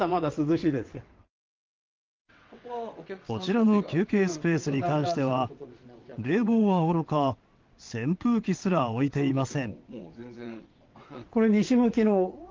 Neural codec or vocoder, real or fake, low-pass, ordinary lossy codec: vocoder, 22.05 kHz, 80 mel bands, WaveNeXt; fake; 7.2 kHz; Opus, 32 kbps